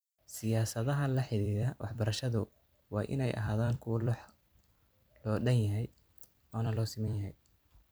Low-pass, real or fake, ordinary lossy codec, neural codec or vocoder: none; fake; none; vocoder, 44.1 kHz, 128 mel bands every 256 samples, BigVGAN v2